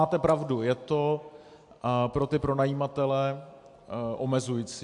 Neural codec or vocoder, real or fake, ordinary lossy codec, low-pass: none; real; AAC, 64 kbps; 10.8 kHz